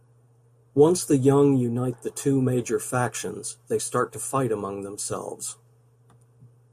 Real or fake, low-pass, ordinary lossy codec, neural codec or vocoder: real; 14.4 kHz; MP3, 64 kbps; none